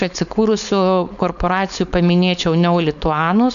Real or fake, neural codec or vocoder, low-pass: fake; codec, 16 kHz, 4.8 kbps, FACodec; 7.2 kHz